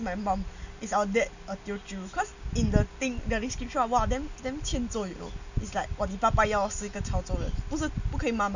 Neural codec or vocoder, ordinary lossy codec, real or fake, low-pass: none; none; real; 7.2 kHz